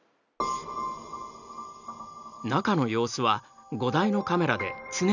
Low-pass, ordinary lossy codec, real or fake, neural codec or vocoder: 7.2 kHz; none; real; none